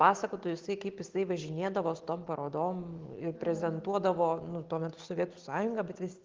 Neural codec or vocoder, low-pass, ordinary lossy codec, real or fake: none; 7.2 kHz; Opus, 16 kbps; real